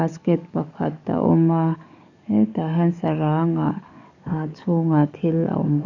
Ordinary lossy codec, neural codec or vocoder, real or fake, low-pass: none; codec, 44.1 kHz, 7.8 kbps, DAC; fake; 7.2 kHz